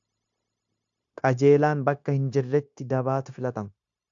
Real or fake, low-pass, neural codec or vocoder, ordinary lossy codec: fake; 7.2 kHz; codec, 16 kHz, 0.9 kbps, LongCat-Audio-Codec; AAC, 64 kbps